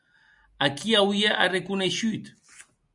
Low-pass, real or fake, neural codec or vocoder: 10.8 kHz; real; none